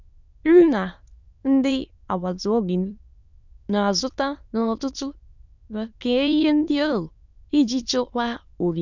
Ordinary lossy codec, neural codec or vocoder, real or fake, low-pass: none; autoencoder, 22.05 kHz, a latent of 192 numbers a frame, VITS, trained on many speakers; fake; 7.2 kHz